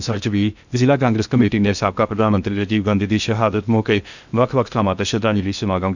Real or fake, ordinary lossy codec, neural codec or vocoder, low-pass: fake; none; codec, 16 kHz in and 24 kHz out, 0.8 kbps, FocalCodec, streaming, 65536 codes; 7.2 kHz